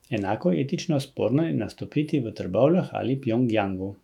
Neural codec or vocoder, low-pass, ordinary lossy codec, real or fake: autoencoder, 48 kHz, 128 numbers a frame, DAC-VAE, trained on Japanese speech; 19.8 kHz; none; fake